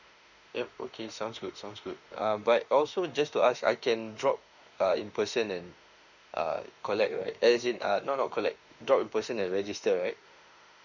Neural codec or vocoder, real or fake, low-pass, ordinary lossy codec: autoencoder, 48 kHz, 32 numbers a frame, DAC-VAE, trained on Japanese speech; fake; 7.2 kHz; none